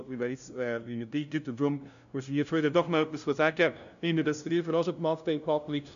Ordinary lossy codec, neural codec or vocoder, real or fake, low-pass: none; codec, 16 kHz, 0.5 kbps, FunCodec, trained on LibriTTS, 25 frames a second; fake; 7.2 kHz